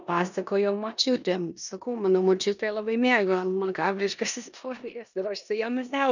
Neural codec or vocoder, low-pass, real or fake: codec, 16 kHz in and 24 kHz out, 0.9 kbps, LongCat-Audio-Codec, four codebook decoder; 7.2 kHz; fake